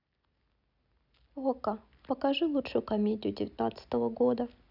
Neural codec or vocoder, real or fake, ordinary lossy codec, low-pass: none; real; none; 5.4 kHz